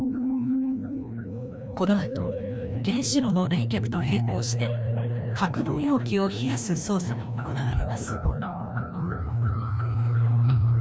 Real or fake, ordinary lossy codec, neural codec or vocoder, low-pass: fake; none; codec, 16 kHz, 1 kbps, FreqCodec, larger model; none